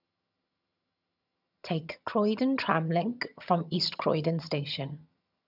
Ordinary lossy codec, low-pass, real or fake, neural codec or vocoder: none; 5.4 kHz; fake; vocoder, 22.05 kHz, 80 mel bands, HiFi-GAN